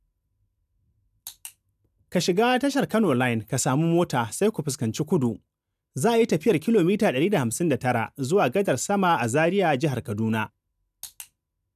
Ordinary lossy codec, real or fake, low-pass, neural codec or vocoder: none; real; 14.4 kHz; none